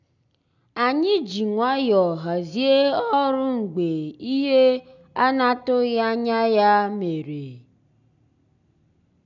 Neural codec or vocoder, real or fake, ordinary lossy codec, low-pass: none; real; none; 7.2 kHz